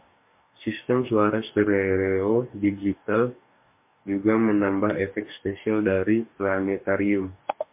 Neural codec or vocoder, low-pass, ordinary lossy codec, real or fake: codec, 44.1 kHz, 2.6 kbps, DAC; 3.6 kHz; MP3, 32 kbps; fake